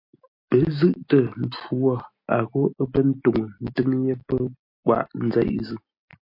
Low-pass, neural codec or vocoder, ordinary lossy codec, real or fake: 5.4 kHz; none; MP3, 32 kbps; real